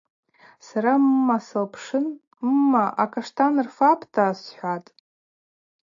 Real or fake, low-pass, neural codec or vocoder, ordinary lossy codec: real; 7.2 kHz; none; AAC, 48 kbps